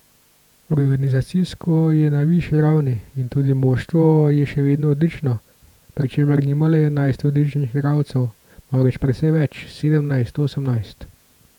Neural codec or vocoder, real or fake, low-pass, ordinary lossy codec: vocoder, 48 kHz, 128 mel bands, Vocos; fake; 19.8 kHz; none